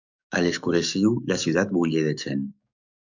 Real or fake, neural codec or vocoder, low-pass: fake; codec, 44.1 kHz, 7.8 kbps, DAC; 7.2 kHz